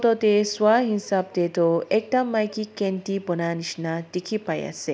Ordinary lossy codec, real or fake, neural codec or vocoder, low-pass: none; real; none; none